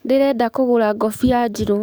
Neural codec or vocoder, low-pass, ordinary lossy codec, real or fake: codec, 44.1 kHz, 7.8 kbps, DAC; none; none; fake